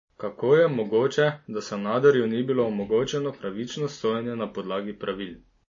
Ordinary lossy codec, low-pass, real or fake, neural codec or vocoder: MP3, 32 kbps; 7.2 kHz; real; none